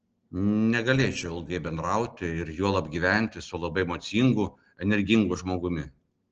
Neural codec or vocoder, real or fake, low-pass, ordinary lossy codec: none; real; 7.2 kHz; Opus, 16 kbps